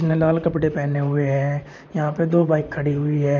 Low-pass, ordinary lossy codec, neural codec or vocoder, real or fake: 7.2 kHz; none; vocoder, 44.1 kHz, 128 mel bands, Pupu-Vocoder; fake